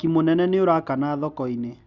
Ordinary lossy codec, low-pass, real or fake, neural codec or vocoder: Opus, 64 kbps; 7.2 kHz; real; none